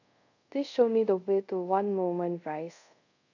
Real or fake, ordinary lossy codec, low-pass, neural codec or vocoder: fake; none; 7.2 kHz; codec, 24 kHz, 0.5 kbps, DualCodec